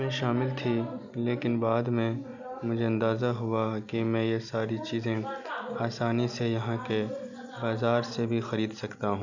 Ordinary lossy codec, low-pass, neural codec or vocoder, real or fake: none; 7.2 kHz; none; real